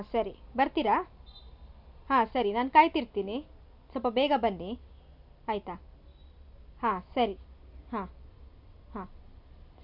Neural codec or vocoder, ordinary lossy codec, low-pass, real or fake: none; none; 5.4 kHz; real